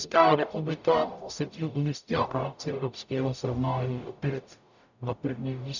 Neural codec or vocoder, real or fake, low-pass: codec, 44.1 kHz, 0.9 kbps, DAC; fake; 7.2 kHz